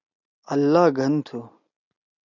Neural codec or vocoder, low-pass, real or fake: none; 7.2 kHz; real